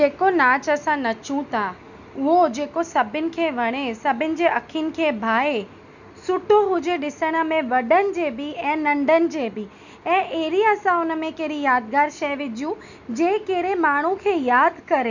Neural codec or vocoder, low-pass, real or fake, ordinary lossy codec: none; 7.2 kHz; real; none